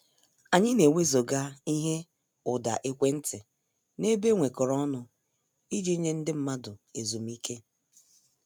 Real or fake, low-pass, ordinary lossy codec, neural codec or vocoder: real; none; none; none